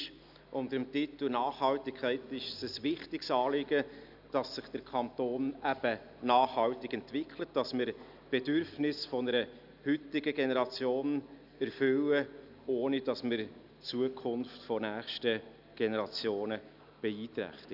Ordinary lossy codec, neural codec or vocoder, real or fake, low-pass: none; none; real; 5.4 kHz